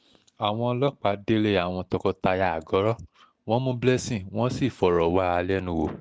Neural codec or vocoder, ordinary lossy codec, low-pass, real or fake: none; none; none; real